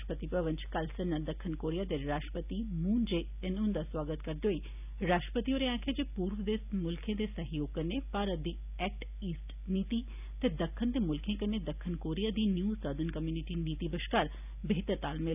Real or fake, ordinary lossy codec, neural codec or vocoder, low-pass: real; none; none; 3.6 kHz